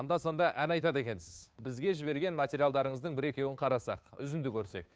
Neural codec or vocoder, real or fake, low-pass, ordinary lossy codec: codec, 16 kHz, 2 kbps, FunCodec, trained on Chinese and English, 25 frames a second; fake; none; none